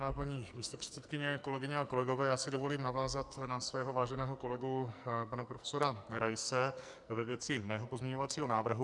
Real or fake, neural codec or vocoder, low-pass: fake; codec, 44.1 kHz, 2.6 kbps, SNAC; 10.8 kHz